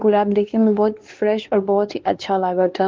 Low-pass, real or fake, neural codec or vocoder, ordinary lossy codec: 7.2 kHz; fake; codec, 16 kHz, 2 kbps, X-Codec, WavLM features, trained on Multilingual LibriSpeech; Opus, 16 kbps